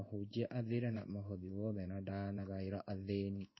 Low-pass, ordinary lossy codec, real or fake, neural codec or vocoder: 7.2 kHz; MP3, 24 kbps; fake; codec, 16 kHz in and 24 kHz out, 1 kbps, XY-Tokenizer